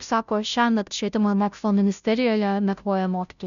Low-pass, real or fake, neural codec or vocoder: 7.2 kHz; fake; codec, 16 kHz, 0.5 kbps, FunCodec, trained on Chinese and English, 25 frames a second